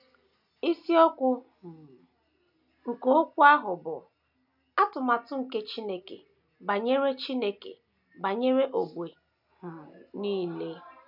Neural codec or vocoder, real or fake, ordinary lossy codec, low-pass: none; real; none; 5.4 kHz